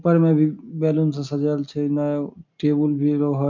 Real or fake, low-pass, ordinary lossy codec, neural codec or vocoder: real; 7.2 kHz; MP3, 64 kbps; none